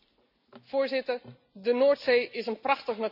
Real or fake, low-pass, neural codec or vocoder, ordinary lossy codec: real; 5.4 kHz; none; none